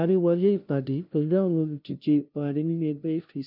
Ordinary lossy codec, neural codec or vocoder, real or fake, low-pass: none; codec, 16 kHz, 0.5 kbps, FunCodec, trained on LibriTTS, 25 frames a second; fake; 5.4 kHz